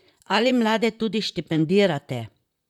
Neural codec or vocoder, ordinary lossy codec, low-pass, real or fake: vocoder, 44.1 kHz, 128 mel bands, Pupu-Vocoder; none; 19.8 kHz; fake